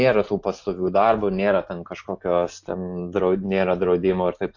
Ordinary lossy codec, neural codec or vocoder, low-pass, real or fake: AAC, 48 kbps; none; 7.2 kHz; real